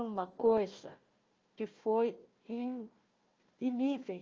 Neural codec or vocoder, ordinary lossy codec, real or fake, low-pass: codec, 16 kHz, 1 kbps, FunCodec, trained on Chinese and English, 50 frames a second; Opus, 24 kbps; fake; 7.2 kHz